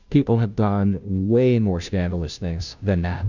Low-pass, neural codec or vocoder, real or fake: 7.2 kHz; codec, 16 kHz, 0.5 kbps, FunCodec, trained on Chinese and English, 25 frames a second; fake